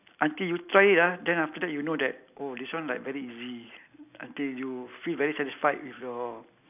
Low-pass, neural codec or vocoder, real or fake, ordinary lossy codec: 3.6 kHz; none; real; none